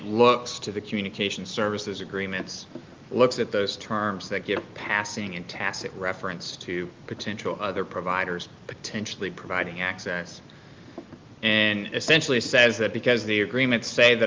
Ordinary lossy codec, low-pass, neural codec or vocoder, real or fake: Opus, 32 kbps; 7.2 kHz; none; real